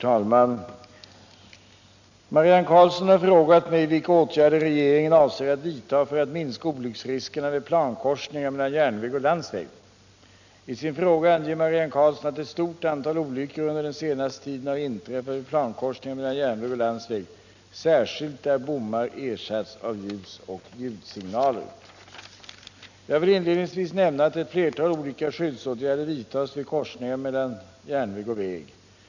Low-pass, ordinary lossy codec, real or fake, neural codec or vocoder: 7.2 kHz; none; real; none